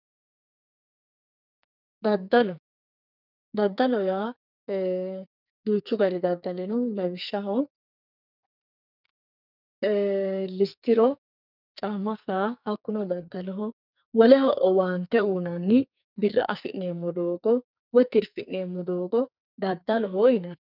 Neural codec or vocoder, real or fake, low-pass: codec, 44.1 kHz, 2.6 kbps, SNAC; fake; 5.4 kHz